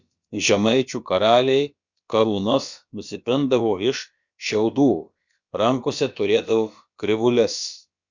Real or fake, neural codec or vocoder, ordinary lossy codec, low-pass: fake; codec, 16 kHz, about 1 kbps, DyCAST, with the encoder's durations; Opus, 64 kbps; 7.2 kHz